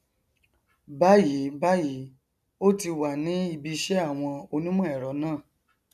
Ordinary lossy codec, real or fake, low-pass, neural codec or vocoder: none; real; 14.4 kHz; none